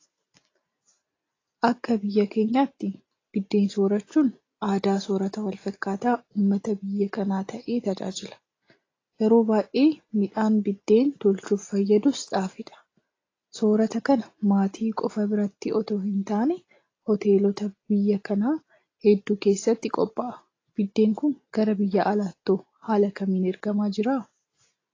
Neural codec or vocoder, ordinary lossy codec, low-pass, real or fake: none; AAC, 32 kbps; 7.2 kHz; real